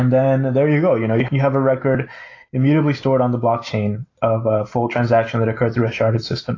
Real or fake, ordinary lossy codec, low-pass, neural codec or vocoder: real; AAC, 32 kbps; 7.2 kHz; none